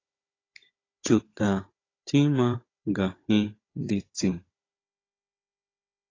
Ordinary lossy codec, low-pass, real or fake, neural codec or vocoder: AAC, 32 kbps; 7.2 kHz; fake; codec, 16 kHz, 16 kbps, FunCodec, trained on Chinese and English, 50 frames a second